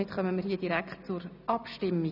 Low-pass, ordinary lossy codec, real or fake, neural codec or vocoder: 5.4 kHz; none; real; none